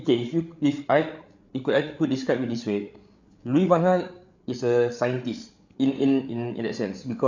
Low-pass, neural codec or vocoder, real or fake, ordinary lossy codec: 7.2 kHz; codec, 16 kHz, 8 kbps, FreqCodec, larger model; fake; none